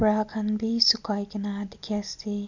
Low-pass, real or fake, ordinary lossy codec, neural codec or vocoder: 7.2 kHz; real; none; none